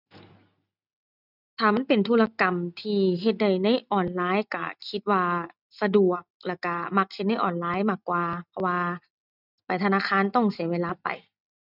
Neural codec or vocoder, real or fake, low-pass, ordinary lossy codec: none; real; 5.4 kHz; none